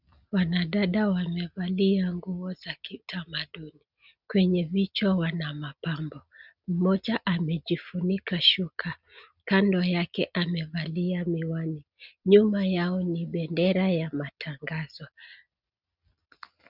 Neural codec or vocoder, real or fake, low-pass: none; real; 5.4 kHz